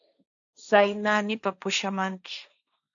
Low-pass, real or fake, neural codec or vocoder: 7.2 kHz; fake; codec, 16 kHz, 1.1 kbps, Voila-Tokenizer